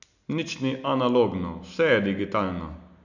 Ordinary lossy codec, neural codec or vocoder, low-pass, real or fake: none; none; 7.2 kHz; real